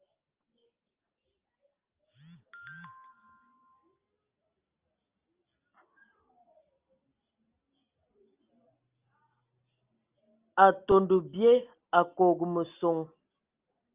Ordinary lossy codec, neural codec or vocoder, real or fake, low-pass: Opus, 24 kbps; none; real; 3.6 kHz